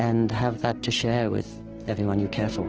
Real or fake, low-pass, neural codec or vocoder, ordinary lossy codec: fake; 7.2 kHz; codec, 16 kHz, 6 kbps, DAC; Opus, 16 kbps